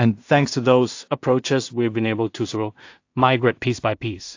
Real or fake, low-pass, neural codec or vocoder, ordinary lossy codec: fake; 7.2 kHz; codec, 16 kHz in and 24 kHz out, 0.4 kbps, LongCat-Audio-Codec, two codebook decoder; AAC, 48 kbps